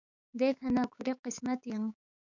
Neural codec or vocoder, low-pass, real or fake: codec, 16 kHz, 6 kbps, DAC; 7.2 kHz; fake